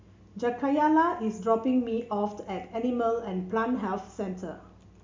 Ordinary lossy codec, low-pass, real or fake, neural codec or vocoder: MP3, 64 kbps; 7.2 kHz; real; none